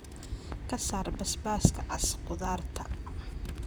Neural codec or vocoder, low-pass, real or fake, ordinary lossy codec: vocoder, 44.1 kHz, 128 mel bands, Pupu-Vocoder; none; fake; none